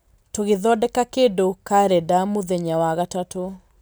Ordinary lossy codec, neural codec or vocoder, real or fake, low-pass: none; none; real; none